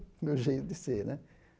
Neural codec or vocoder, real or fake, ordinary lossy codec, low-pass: none; real; none; none